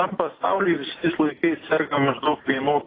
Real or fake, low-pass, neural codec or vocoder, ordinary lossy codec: real; 5.4 kHz; none; AAC, 24 kbps